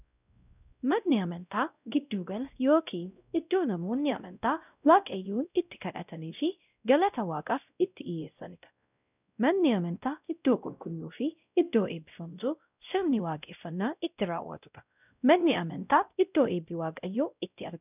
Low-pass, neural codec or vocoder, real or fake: 3.6 kHz; codec, 16 kHz, 0.5 kbps, X-Codec, WavLM features, trained on Multilingual LibriSpeech; fake